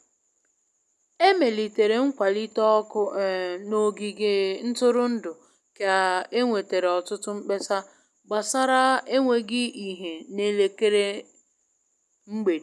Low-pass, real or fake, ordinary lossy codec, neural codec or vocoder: none; real; none; none